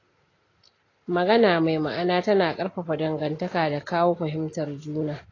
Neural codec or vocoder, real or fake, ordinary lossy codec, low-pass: none; real; AAC, 32 kbps; 7.2 kHz